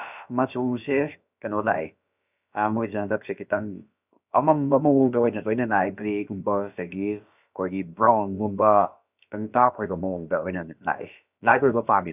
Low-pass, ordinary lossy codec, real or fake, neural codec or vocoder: 3.6 kHz; none; fake; codec, 16 kHz, about 1 kbps, DyCAST, with the encoder's durations